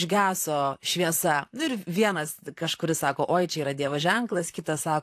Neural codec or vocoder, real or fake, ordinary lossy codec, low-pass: vocoder, 44.1 kHz, 128 mel bands, Pupu-Vocoder; fake; AAC, 64 kbps; 14.4 kHz